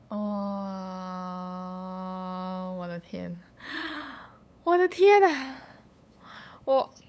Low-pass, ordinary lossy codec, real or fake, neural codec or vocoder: none; none; fake; codec, 16 kHz, 8 kbps, FunCodec, trained on LibriTTS, 25 frames a second